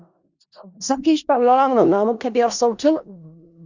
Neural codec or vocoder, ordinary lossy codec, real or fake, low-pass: codec, 16 kHz in and 24 kHz out, 0.4 kbps, LongCat-Audio-Codec, four codebook decoder; Opus, 64 kbps; fake; 7.2 kHz